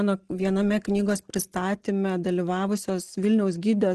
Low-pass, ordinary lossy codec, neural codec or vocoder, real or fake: 10.8 kHz; Opus, 16 kbps; none; real